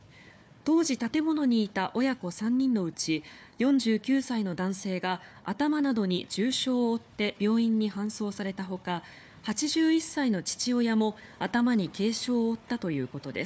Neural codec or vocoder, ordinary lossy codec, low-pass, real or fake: codec, 16 kHz, 4 kbps, FunCodec, trained on Chinese and English, 50 frames a second; none; none; fake